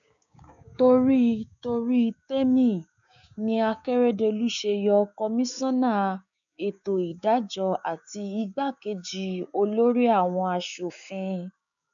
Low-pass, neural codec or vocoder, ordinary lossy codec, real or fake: 7.2 kHz; codec, 16 kHz, 6 kbps, DAC; none; fake